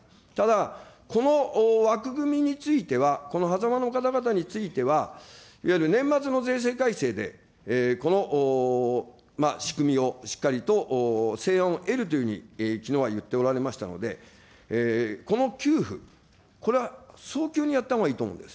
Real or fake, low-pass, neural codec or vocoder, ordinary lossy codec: real; none; none; none